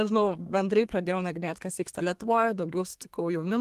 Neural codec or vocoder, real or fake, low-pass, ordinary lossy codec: codec, 32 kHz, 1.9 kbps, SNAC; fake; 14.4 kHz; Opus, 24 kbps